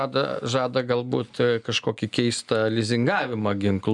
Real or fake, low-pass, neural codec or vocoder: real; 10.8 kHz; none